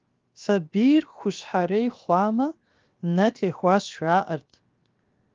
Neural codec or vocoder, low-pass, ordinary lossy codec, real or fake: codec, 16 kHz, 0.7 kbps, FocalCodec; 7.2 kHz; Opus, 32 kbps; fake